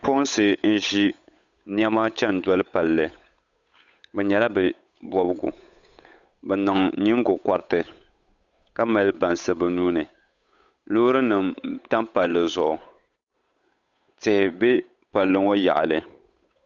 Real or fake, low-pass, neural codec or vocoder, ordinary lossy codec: fake; 7.2 kHz; codec, 16 kHz, 8 kbps, FunCodec, trained on Chinese and English, 25 frames a second; Opus, 64 kbps